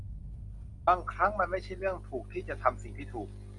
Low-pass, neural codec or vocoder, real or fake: 10.8 kHz; none; real